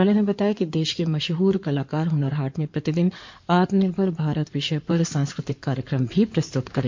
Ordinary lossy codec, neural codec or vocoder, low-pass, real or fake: MP3, 48 kbps; codec, 16 kHz in and 24 kHz out, 2.2 kbps, FireRedTTS-2 codec; 7.2 kHz; fake